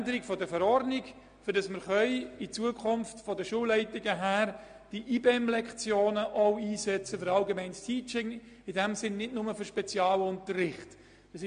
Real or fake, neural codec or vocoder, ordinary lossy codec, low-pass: real; none; MP3, 48 kbps; 9.9 kHz